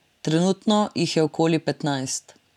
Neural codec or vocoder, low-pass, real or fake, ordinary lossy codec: none; 19.8 kHz; real; none